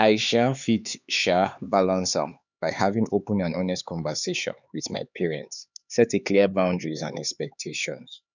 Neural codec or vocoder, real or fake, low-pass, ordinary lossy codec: codec, 16 kHz, 4 kbps, X-Codec, HuBERT features, trained on LibriSpeech; fake; 7.2 kHz; none